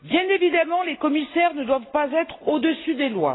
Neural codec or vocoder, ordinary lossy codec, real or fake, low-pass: none; AAC, 16 kbps; real; 7.2 kHz